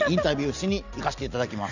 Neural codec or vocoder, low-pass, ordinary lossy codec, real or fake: none; 7.2 kHz; none; real